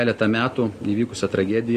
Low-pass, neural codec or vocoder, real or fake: 14.4 kHz; none; real